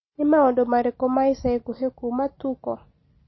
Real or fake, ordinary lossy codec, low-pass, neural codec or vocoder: real; MP3, 24 kbps; 7.2 kHz; none